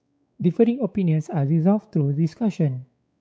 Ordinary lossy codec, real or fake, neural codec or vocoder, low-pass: none; fake; codec, 16 kHz, 4 kbps, X-Codec, WavLM features, trained on Multilingual LibriSpeech; none